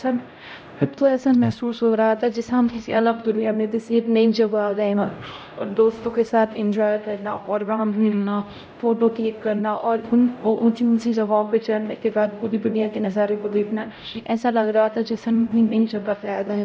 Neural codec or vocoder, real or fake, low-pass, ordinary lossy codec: codec, 16 kHz, 0.5 kbps, X-Codec, HuBERT features, trained on LibriSpeech; fake; none; none